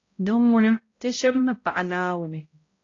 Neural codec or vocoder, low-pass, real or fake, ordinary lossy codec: codec, 16 kHz, 0.5 kbps, X-Codec, HuBERT features, trained on balanced general audio; 7.2 kHz; fake; AAC, 32 kbps